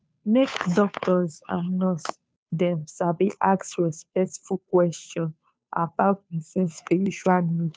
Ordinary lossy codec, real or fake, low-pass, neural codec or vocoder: none; fake; none; codec, 16 kHz, 2 kbps, FunCodec, trained on Chinese and English, 25 frames a second